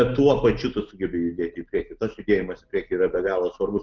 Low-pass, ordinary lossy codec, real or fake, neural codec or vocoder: 7.2 kHz; Opus, 32 kbps; real; none